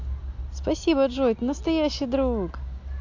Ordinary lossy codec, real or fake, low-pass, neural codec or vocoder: AAC, 48 kbps; real; 7.2 kHz; none